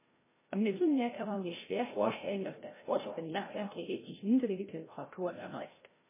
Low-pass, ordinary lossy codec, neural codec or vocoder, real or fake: 3.6 kHz; MP3, 16 kbps; codec, 16 kHz, 0.5 kbps, FreqCodec, larger model; fake